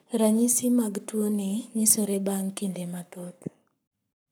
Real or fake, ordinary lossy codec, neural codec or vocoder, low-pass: fake; none; codec, 44.1 kHz, 7.8 kbps, Pupu-Codec; none